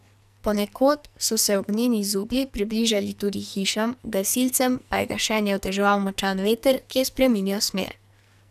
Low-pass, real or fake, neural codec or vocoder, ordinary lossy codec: 14.4 kHz; fake; codec, 44.1 kHz, 2.6 kbps, SNAC; none